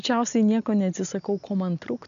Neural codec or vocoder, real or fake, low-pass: none; real; 7.2 kHz